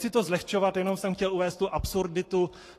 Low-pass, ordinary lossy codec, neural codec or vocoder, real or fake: 14.4 kHz; AAC, 48 kbps; codec, 44.1 kHz, 7.8 kbps, Pupu-Codec; fake